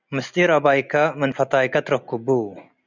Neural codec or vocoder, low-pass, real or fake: none; 7.2 kHz; real